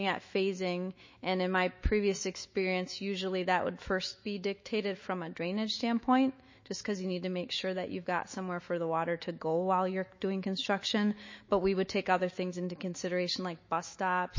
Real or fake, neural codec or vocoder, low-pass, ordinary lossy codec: fake; autoencoder, 48 kHz, 128 numbers a frame, DAC-VAE, trained on Japanese speech; 7.2 kHz; MP3, 32 kbps